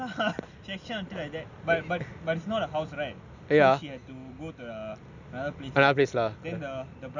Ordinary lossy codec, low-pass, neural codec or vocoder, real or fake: none; 7.2 kHz; none; real